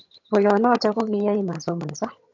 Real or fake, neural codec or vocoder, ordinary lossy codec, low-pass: fake; vocoder, 22.05 kHz, 80 mel bands, HiFi-GAN; MP3, 64 kbps; 7.2 kHz